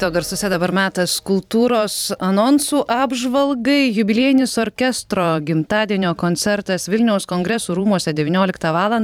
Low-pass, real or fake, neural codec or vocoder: 19.8 kHz; fake; vocoder, 44.1 kHz, 128 mel bands every 512 samples, BigVGAN v2